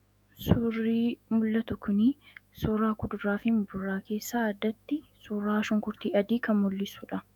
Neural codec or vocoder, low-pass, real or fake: autoencoder, 48 kHz, 128 numbers a frame, DAC-VAE, trained on Japanese speech; 19.8 kHz; fake